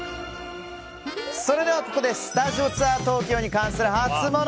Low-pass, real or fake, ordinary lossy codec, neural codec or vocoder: none; real; none; none